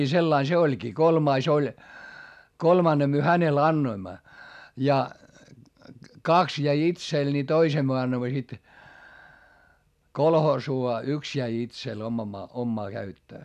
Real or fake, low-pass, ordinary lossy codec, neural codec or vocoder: real; 14.4 kHz; none; none